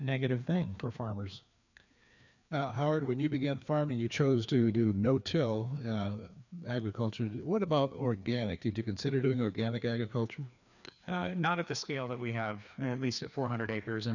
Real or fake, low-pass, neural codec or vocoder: fake; 7.2 kHz; codec, 16 kHz, 2 kbps, FreqCodec, larger model